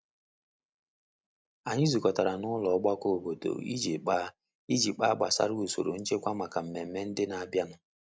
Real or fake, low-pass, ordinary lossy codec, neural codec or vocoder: real; none; none; none